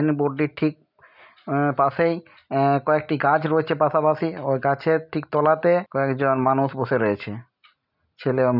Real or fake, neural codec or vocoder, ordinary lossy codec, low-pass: real; none; none; 5.4 kHz